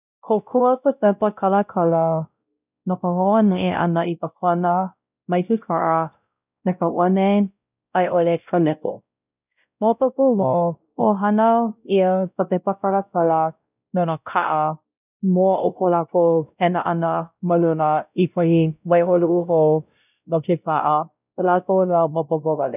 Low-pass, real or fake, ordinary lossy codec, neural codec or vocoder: 3.6 kHz; fake; none; codec, 16 kHz, 0.5 kbps, X-Codec, WavLM features, trained on Multilingual LibriSpeech